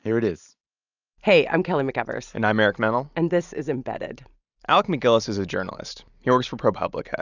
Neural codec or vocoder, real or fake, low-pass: none; real; 7.2 kHz